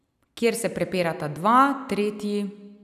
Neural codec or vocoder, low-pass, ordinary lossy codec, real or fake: none; 14.4 kHz; none; real